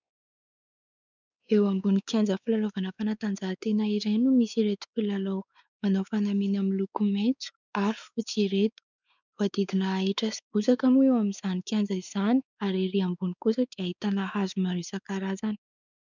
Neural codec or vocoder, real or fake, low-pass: codec, 16 kHz, 4 kbps, X-Codec, WavLM features, trained on Multilingual LibriSpeech; fake; 7.2 kHz